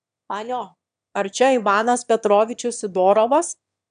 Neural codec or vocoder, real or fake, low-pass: autoencoder, 22.05 kHz, a latent of 192 numbers a frame, VITS, trained on one speaker; fake; 9.9 kHz